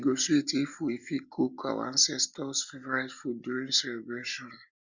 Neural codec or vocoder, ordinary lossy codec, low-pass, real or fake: none; Opus, 64 kbps; 7.2 kHz; real